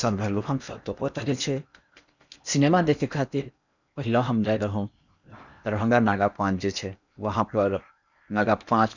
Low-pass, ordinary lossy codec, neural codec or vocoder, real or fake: 7.2 kHz; none; codec, 16 kHz in and 24 kHz out, 0.8 kbps, FocalCodec, streaming, 65536 codes; fake